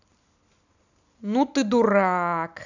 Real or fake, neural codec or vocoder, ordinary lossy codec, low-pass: real; none; none; 7.2 kHz